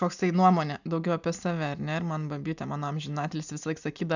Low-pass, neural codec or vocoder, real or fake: 7.2 kHz; none; real